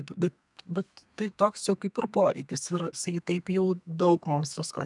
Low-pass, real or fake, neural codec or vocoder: 10.8 kHz; fake; codec, 32 kHz, 1.9 kbps, SNAC